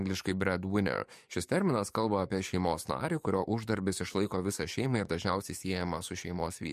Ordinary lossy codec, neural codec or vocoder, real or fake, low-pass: MP3, 64 kbps; codec, 44.1 kHz, 7.8 kbps, DAC; fake; 14.4 kHz